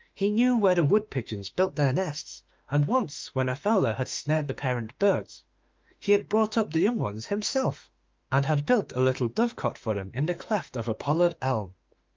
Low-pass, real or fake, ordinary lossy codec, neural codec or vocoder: 7.2 kHz; fake; Opus, 24 kbps; autoencoder, 48 kHz, 32 numbers a frame, DAC-VAE, trained on Japanese speech